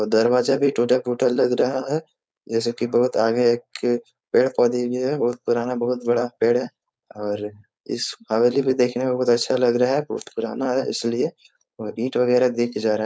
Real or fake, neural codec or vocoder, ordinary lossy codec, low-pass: fake; codec, 16 kHz, 4.8 kbps, FACodec; none; none